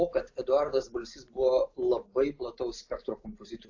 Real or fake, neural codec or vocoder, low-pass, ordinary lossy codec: real; none; 7.2 kHz; AAC, 48 kbps